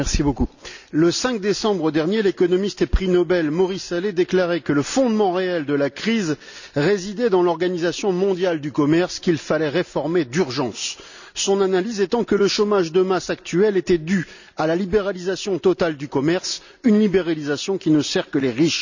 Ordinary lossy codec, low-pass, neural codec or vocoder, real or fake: none; 7.2 kHz; none; real